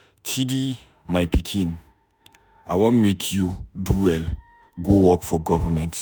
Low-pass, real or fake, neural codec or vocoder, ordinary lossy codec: none; fake; autoencoder, 48 kHz, 32 numbers a frame, DAC-VAE, trained on Japanese speech; none